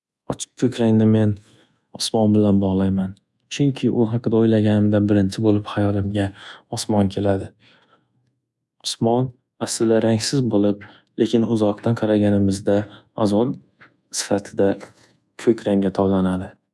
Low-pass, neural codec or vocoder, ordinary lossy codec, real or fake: none; codec, 24 kHz, 1.2 kbps, DualCodec; none; fake